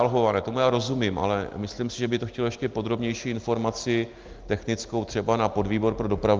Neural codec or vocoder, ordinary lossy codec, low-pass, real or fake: none; Opus, 24 kbps; 7.2 kHz; real